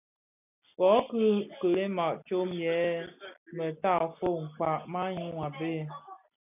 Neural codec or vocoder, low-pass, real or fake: none; 3.6 kHz; real